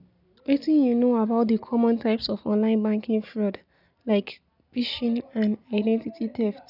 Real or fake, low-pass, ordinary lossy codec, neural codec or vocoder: real; 5.4 kHz; none; none